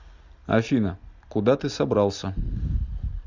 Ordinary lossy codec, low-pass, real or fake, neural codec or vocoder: Opus, 64 kbps; 7.2 kHz; real; none